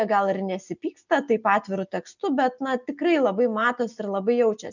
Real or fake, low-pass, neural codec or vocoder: real; 7.2 kHz; none